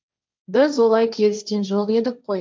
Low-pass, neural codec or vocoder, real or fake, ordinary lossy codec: none; codec, 16 kHz, 1.1 kbps, Voila-Tokenizer; fake; none